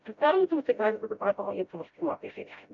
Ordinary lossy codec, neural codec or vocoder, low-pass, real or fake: MP3, 48 kbps; codec, 16 kHz, 0.5 kbps, FreqCodec, smaller model; 7.2 kHz; fake